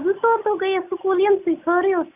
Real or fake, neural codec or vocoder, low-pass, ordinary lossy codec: real; none; 3.6 kHz; AAC, 32 kbps